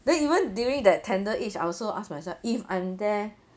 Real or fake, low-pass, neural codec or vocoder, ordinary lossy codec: real; none; none; none